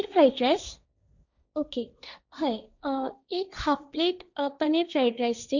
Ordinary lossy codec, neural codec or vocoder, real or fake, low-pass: none; codec, 16 kHz, 1.1 kbps, Voila-Tokenizer; fake; 7.2 kHz